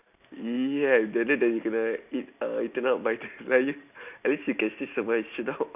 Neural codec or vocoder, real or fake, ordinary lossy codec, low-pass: none; real; none; 3.6 kHz